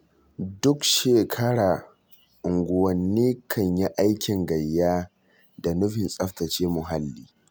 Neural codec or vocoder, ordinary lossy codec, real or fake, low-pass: none; none; real; none